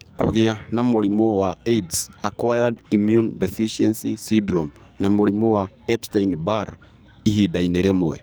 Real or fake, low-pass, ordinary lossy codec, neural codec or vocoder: fake; none; none; codec, 44.1 kHz, 2.6 kbps, SNAC